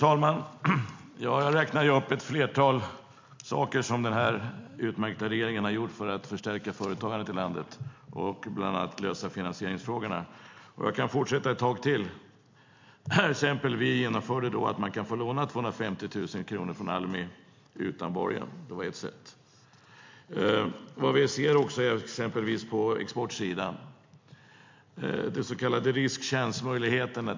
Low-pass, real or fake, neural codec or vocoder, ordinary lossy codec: 7.2 kHz; real; none; MP3, 48 kbps